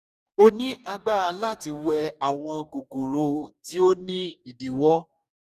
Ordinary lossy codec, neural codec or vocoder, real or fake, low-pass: none; codec, 44.1 kHz, 2.6 kbps, DAC; fake; 14.4 kHz